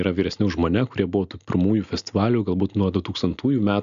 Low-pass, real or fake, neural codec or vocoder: 7.2 kHz; real; none